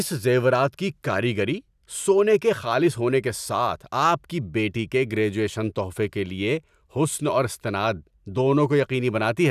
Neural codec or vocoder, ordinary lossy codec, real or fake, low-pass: none; none; real; 14.4 kHz